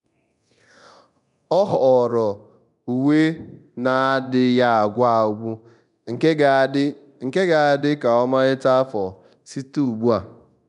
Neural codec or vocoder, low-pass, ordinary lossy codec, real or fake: codec, 24 kHz, 0.9 kbps, DualCodec; 10.8 kHz; none; fake